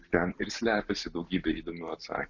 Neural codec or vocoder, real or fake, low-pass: none; real; 7.2 kHz